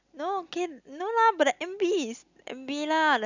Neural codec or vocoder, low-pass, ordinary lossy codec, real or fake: none; 7.2 kHz; none; real